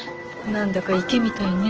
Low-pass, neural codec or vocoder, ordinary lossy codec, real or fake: 7.2 kHz; none; Opus, 16 kbps; real